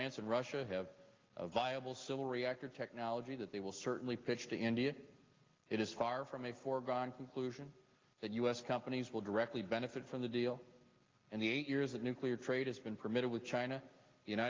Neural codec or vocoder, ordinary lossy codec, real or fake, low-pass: none; Opus, 24 kbps; real; 7.2 kHz